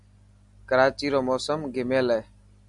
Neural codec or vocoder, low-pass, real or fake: none; 10.8 kHz; real